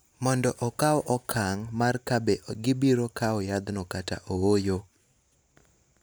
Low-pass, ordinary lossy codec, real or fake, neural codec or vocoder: none; none; real; none